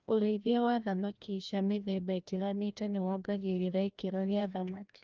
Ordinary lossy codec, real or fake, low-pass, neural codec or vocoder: Opus, 24 kbps; fake; 7.2 kHz; codec, 16 kHz, 1 kbps, FreqCodec, larger model